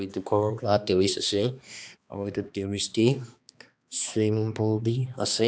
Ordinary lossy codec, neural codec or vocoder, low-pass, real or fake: none; codec, 16 kHz, 2 kbps, X-Codec, HuBERT features, trained on balanced general audio; none; fake